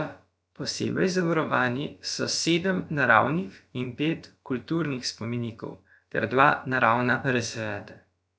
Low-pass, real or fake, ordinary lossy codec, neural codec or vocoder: none; fake; none; codec, 16 kHz, about 1 kbps, DyCAST, with the encoder's durations